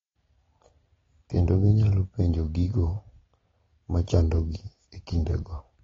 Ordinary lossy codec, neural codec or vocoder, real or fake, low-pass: AAC, 24 kbps; none; real; 7.2 kHz